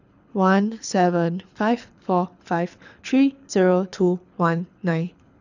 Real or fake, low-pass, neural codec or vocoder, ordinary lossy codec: fake; 7.2 kHz; codec, 24 kHz, 6 kbps, HILCodec; none